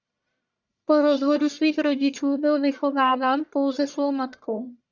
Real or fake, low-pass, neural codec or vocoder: fake; 7.2 kHz; codec, 44.1 kHz, 1.7 kbps, Pupu-Codec